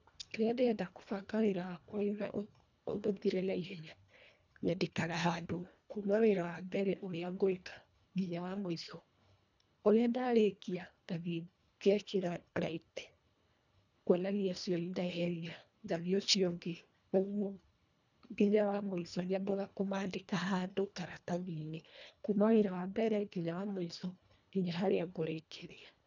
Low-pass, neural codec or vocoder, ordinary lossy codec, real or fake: 7.2 kHz; codec, 24 kHz, 1.5 kbps, HILCodec; none; fake